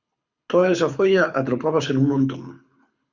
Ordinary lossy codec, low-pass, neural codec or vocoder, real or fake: Opus, 64 kbps; 7.2 kHz; codec, 24 kHz, 6 kbps, HILCodec; fake